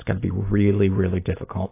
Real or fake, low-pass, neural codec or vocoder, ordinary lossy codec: real; 3.6 kHz; none; AAC, 24 kbps